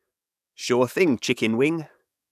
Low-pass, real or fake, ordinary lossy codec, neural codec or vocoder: 14.4 kHz; fake; none; codec, 44.1 kHz, 7.8 kbps, DAC